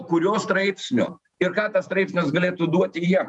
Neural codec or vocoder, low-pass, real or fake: vocoder, 48 kHz, 128 mel bands, Vocos; 10.8 kHz; fake